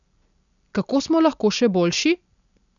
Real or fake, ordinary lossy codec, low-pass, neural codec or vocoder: real; none; 7.2 kHz; none